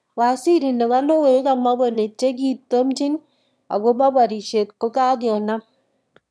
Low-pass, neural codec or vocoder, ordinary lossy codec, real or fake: none; autoencoder, 22.05 kHz, a latent of 192 numbers a frame, VITS, trained on one speaker; none; fake